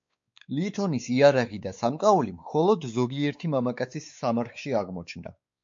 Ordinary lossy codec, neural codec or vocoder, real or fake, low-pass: MP3, 48 kbps; codec, 16 kHz, 4 kbps, X-Codec, WavLM features, trained on Multilingual LibriSpeech; fake; 7.2 kHz